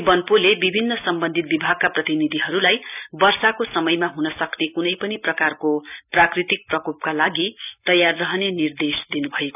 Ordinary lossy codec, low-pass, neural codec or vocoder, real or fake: none; 3.6 kHz; none; real